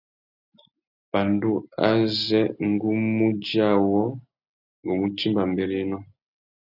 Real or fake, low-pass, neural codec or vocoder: real; 5.4 kHz; none